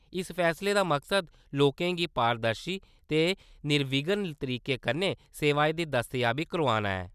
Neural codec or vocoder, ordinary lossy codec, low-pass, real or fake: none; none; 14.4 kHz; real